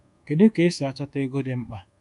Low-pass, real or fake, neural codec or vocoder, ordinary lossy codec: 10.8 kHz; fake; codec, 24 kHz, 1.2 kbps, DualCodec; none